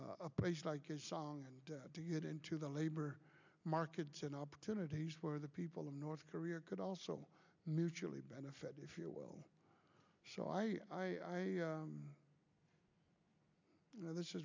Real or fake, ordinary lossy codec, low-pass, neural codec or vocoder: real; MP3, 64 kbps; 7.2 kHz; none